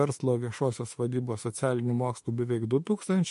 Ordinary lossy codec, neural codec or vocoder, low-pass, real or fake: MP3, 48 kbps; autoencoder, 48 kHz, 32 numbers a frame, DAC-VAE, trained on Japanese speech; 14.4 kHz; fake